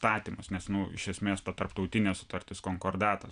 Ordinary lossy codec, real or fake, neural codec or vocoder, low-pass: MP3, 96 kbps; real; none; 9.9 kHz